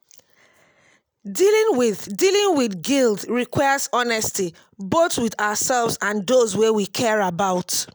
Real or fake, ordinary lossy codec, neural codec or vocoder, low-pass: real; none; none; none